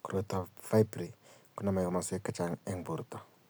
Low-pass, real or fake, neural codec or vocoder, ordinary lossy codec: none; real; none; none